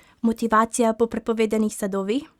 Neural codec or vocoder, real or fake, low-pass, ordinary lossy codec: none; real; 19.8 kHz; Opus, 64 kbps